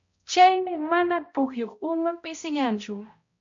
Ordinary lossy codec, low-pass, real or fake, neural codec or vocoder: MP3, 48 kbps; 7.2 kHz; fake; codec, 16 kHz, 0.5 kbps, X-Codec, HuBERT features, trained on balanced general audio